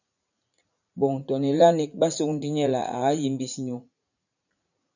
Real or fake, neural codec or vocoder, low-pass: fake; vocoder, 44.1 kHz, 80 mel bands, Vocos; 7.2 kHz